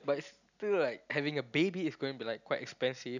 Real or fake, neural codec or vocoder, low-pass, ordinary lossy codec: real; none; 7.2 kHz; none